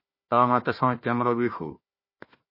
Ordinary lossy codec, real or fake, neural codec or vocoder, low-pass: MP3, 24 kbps; fake; codec, 16 kHz, 1 kbps, FunCodec, trained on Chinese and English, 50 frames a second; 5.4 kHz